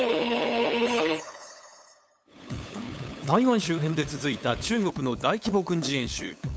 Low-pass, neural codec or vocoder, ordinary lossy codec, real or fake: none; codec, 16 kHz, 8 kbps, FunCodec, trained on LibriTTS, 25 frames a second; none; fake